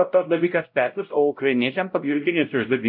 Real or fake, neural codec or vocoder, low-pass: fake; codec, 16 kHz, 0.5 kbps, X-Codec, WavLM features, trained on Multilingual LibriSpeech; 5.4 kHz